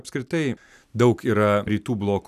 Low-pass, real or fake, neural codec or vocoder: 14.4 kHz; real; none